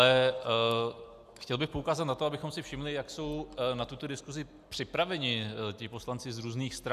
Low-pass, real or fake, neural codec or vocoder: 14.4 kHz; real; none